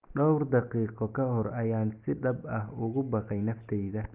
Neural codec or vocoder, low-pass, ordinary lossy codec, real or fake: none; 3.6 kHz; Opus, 24 kbps; real